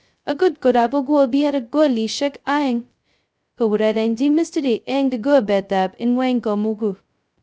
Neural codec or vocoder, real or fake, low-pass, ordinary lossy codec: codec, 16 kHz, 0.2 kbps, FocalCodec; fake; none; none